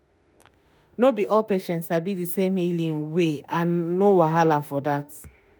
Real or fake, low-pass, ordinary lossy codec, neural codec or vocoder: fake; none; none; autoencoder, 48 kHz, 32 numbers a frame, DAC-VAE, trained on Japanese speech